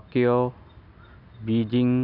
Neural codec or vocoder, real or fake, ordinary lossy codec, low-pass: none; real; none; 5.4 kHz